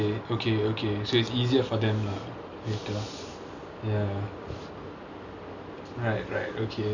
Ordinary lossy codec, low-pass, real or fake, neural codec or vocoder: none; 7.2 kHz; real; none